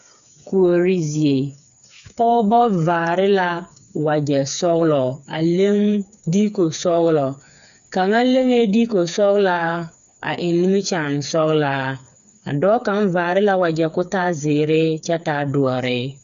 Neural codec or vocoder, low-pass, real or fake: codec, 16 kHz, 4 kbps, FreqCodec, smaller model; 7.2 kHz; fake